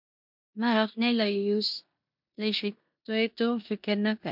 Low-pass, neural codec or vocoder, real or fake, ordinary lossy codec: 5.4 kHz; codec, 16 kHz in and 24 kHz out, 0.9 kbps, LongCat-Audio-Codec, four codebook decoder; fake; MP3, 48 kbps